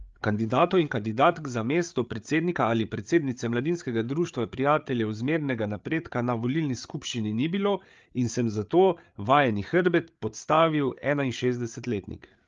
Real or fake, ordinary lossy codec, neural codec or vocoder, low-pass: fake; Opus, 32 kbps; codec, 16 kHz, 8 kbps, FreqCodec, larger model; 7.2 kHz